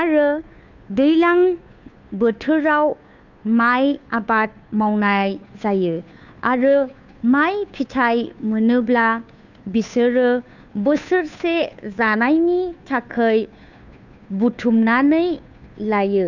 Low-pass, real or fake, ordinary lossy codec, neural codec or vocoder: 7.2 kHz; fake; none; codec, 16 kHz, 2 kbps, FunCodec, trained on Chinese and English, 25 frames a second